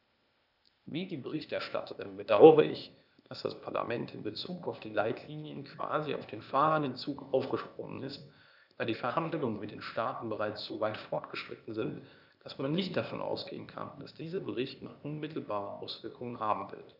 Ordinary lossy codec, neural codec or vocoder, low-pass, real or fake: none; codec, 16 kHz, 0.8 kbps, ZipCodec; 5.4 kHz; fake